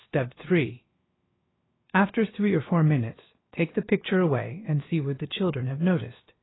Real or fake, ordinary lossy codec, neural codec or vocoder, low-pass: fake; AAC, 16 kbps; codec, 16 kHz, about 1 kbps, DyCAST, with the encoder's durations; 7.2 kHz